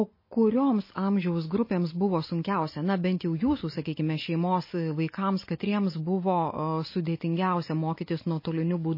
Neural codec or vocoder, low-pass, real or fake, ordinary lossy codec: none; 5.4 kHz; real; MP3, 24 kbps